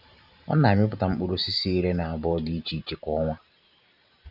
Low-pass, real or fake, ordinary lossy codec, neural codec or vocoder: 5.4 kHz; fake; none; vocoder, 44.1 kHz, 128 mel bands every 512 samples, BigVGAN v2